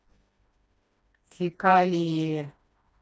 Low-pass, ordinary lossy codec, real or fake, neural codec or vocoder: none; none; fake; codec, 16 kHz, 1 kbps, FreqCodec, smaller model